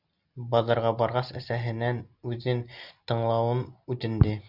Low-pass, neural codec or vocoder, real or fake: 5.4 kHz; none; real